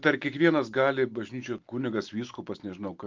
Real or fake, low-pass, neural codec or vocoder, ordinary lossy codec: real; 7.2 kHz; none; Opus, 32 kbps